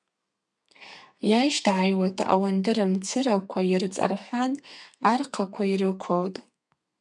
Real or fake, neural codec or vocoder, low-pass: fake; codec, 32 kHz, 1.9 kbps, SNAC; 10.8 kHz